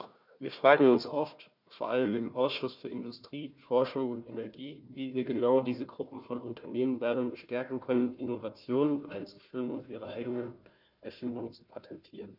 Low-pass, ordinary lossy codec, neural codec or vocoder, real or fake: 5.4 kHz; none; codec, 16 kHz, 1 kbps, FunCodec, trained on Chinese and English, 50 frames a second; fake